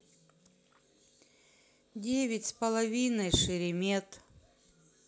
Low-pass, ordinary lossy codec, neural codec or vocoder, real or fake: none; none; none; real